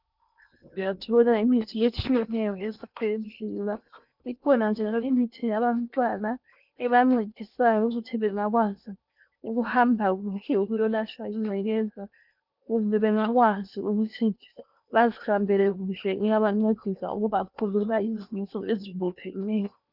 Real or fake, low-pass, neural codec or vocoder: fake; 5.4 kHz; codec, 16 kHz in and 24 kHz out, 0.8 kbps, FocalCodec, streaming, 65536 codes